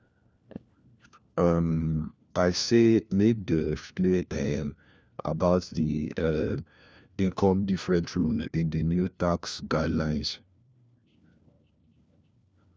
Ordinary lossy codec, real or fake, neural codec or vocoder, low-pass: none; fake; codec, 16 kHz, 1 kbps, FunCodec, trained on LibriTTS, 50 frames a second; none